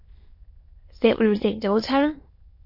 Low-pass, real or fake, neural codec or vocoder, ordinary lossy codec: 5.4 kHz; fake; autoencoder, 22.05 kHz, a latent of 192 numbers a frame, VITS, trained on many speakers; MP3, 32 kbps